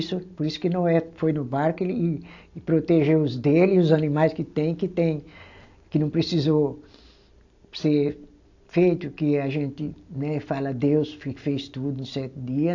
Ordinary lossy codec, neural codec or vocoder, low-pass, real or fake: AAC, 48 kbps; none; 7.2 kHz; real